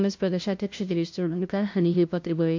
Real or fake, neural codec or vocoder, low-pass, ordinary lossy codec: fake; codec, 16 kHz, 0.5 kbps, FunCodec, trained on LibriTTS, 25 frames a second; 7.2 kHz; MP3, 48 kbps